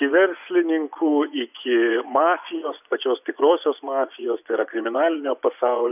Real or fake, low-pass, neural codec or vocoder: fake; 3.6 kHz; vocoder, 24 kHz, 100 mel bands, Vocos